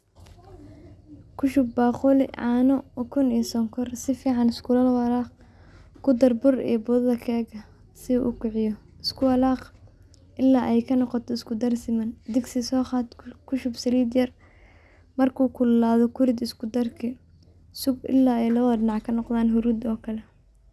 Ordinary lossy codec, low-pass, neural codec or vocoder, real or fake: none; none; none; real